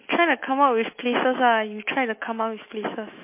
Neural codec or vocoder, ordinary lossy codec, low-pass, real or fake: autoencoder, 48 kHz, 128 numbers a frame, DAC-VAE, trained on Japanese speech; MP3, 24 kbps; 3.6 kHz; fake